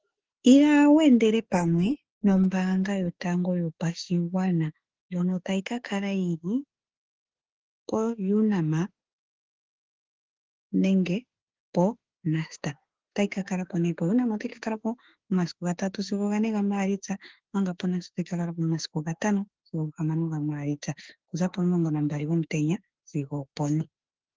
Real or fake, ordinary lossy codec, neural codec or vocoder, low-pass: fake; Opus, 16 kbps; autoencoder, 48 kHz, 32 numbers a frame, DAC-VAE, trained on Japanese speech; 7.2 kHz